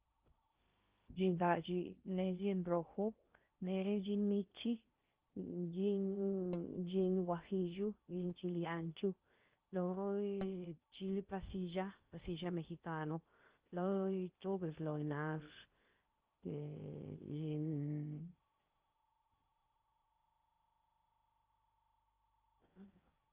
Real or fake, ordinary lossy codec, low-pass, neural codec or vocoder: fake; Opus, 24 kbps; 3.6 kHz; codec, 16 kHz in and 24 kHz out, 0.6 kbps, FocalCodec, streaming, 2048 codes